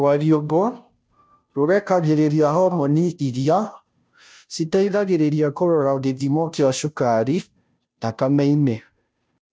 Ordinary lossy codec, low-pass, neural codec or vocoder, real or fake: none; none; codec, 16 kHz, 0.5 kbps, FunCodec, trained on Chinese and English, 25 frames a second; fake